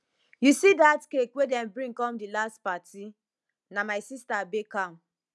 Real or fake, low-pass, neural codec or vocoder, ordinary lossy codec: real; none; none; none